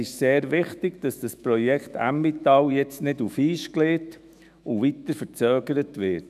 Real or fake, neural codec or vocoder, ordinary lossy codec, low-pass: fake; autoencoder, 48 kHz, 128 numbers a frame, DAC-VAE, trained on Japanese speech; none; 14.4 kHz